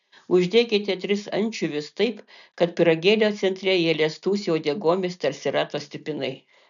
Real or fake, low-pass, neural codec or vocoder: real; 7.2 kHz; none